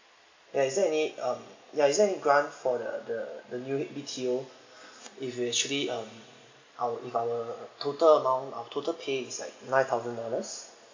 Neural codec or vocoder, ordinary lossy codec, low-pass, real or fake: none; MP3, 48 kbps; 7.2 kHz; real